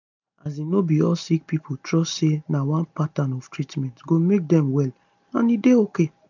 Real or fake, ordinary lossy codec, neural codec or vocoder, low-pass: real; none; none; 7.2 kHz